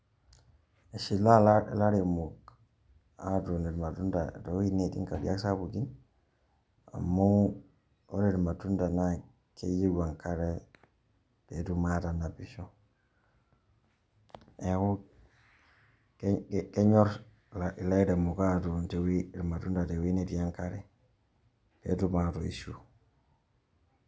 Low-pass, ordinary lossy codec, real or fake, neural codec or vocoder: none; none; real; none